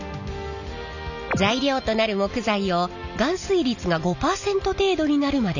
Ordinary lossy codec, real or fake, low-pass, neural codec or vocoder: none; real; 7.2 kHz; none